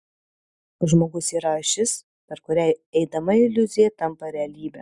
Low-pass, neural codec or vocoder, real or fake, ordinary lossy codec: 10.8 kHz; none; real; Opus, 64 kbps